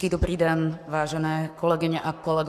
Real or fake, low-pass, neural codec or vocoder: fake; 14.4 kHz; codec, 44.1 kHz, 7.8 kbps, Pupu-Codec